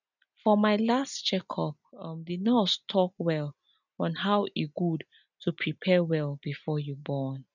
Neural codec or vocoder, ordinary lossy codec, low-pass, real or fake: none; none; 7.2 kHz; real